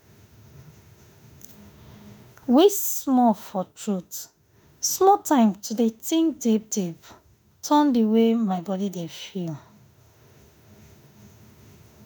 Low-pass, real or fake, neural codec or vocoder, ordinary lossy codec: none; fake; autoencoder, 48 kHz, 32 numbers a frame, DAC-VAE, trained on Japanese speech; none